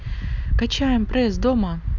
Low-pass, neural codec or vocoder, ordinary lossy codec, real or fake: 7.2 kHz; none; none; real